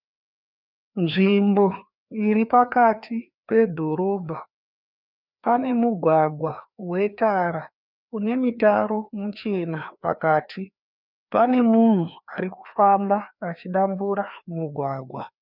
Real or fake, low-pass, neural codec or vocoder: fake; 5.4 kHz; codec, 16 kHz, 2 kbps, FreqCodec, larger model